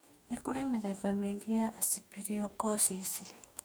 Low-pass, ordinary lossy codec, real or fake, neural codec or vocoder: none; none; fake; codec, 44.1 kHz, 2.6 kbps, DAC